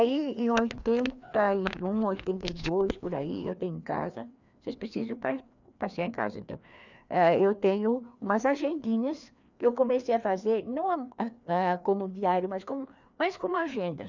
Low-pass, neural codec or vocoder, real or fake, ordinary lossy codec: 7.2 kHz; codec, 16 kHz, 2 kbps, FreqCodec, larger model; fake; none